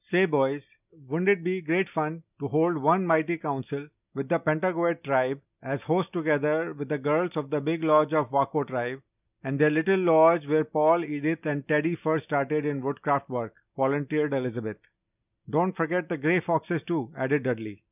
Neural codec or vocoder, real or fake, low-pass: none; real; 3.6 kHz